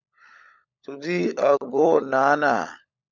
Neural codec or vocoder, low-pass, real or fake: codec, 16 kHz, 16 kbps, FunCodec, trained on LibriTTS, 50 frames a second; 7.2 kHz; fake